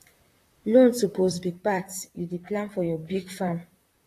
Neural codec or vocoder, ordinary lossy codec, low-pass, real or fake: vocoder, 44.1 kHz, 128 mel bands, Pupu-Vocoder; AAC, 48 kbps; 14.4 kHz; fake